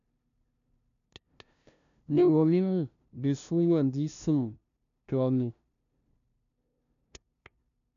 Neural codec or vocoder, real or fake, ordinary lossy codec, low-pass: codec, 16 kHz, 0.5 kbps, FunCodec, trained on LibriTTS, 25 frames a second; fake; none; 7.2 kHz